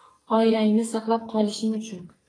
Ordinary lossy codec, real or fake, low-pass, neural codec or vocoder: AAC, 32 kbps; fake; 9.9 kHz; codec, 44.1 kHz, 2.6 kbps, SNAC